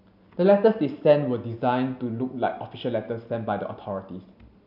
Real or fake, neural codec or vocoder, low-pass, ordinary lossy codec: real; none; 5.4 kHz; none